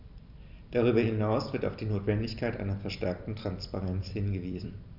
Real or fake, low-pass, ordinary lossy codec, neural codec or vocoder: fake; 5.4 kHz; none; vocoder, 44.1 kHz, 128 mel bands every 256 samples, BigVGAN v2